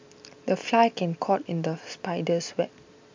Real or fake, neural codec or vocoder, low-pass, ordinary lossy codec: real; none; 7.2 kHz; MP3, 64 kbps